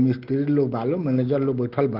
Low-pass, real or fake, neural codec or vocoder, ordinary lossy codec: 5.4 kHz; real; none; Opus, 16 kbps